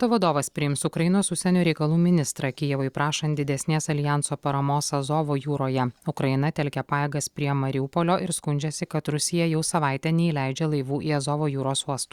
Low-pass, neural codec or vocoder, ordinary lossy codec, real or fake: 19.8 kHz; none; Opus, 64 kbps; real